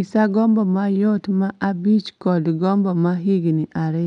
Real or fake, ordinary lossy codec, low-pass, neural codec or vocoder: real; none; 10.8 kHz; none